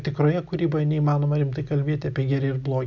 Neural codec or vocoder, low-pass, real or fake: none; 7.2 kHz; real